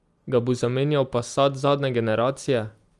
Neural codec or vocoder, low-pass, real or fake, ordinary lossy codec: none; 10.8 kHz; real; Opus, 32 kbps